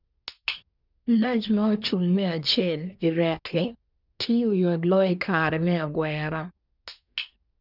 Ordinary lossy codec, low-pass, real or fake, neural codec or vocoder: none; 5.4 kHz; fake; codec, 24 kHz, 1 kbps, SNAC